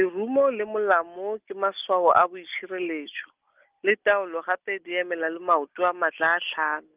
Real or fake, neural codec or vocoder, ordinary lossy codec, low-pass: real; none; Opus, 24 kbps; 3.6 kHz